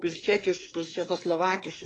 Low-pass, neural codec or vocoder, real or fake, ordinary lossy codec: 10.8 kHz; codec, 24 kHz, 1 kbps, SNAC; fake; AAC, 32 kbps